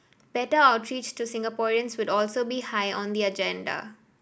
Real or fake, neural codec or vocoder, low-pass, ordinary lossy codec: real; none; none; none